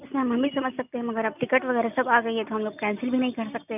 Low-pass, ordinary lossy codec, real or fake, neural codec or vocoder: 3.6 kHz; none; real; none